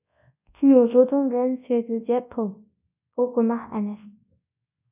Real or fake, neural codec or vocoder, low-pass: fake; codec, 24 kHz, 0.9 kbps, WavTokenizer, large speech release; 3.6 kHz